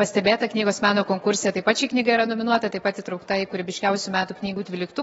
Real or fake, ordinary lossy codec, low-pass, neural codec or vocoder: fake; AAC, 24 kbps; 19.8 kHz; autoencoder, 48 kHz, 128 numbers a frame, DAC-VAE, trained on Japanese speech